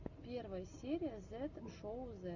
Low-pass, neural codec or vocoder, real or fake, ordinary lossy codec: 7.2 kHz; none; real; Opus, 64 kbps